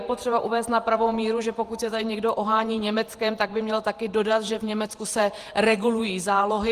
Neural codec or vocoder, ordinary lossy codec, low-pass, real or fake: vocoder, 48 kHz, 128 mel bands, Vocos; Opus, 24 kbps; 14.4 kHz; fake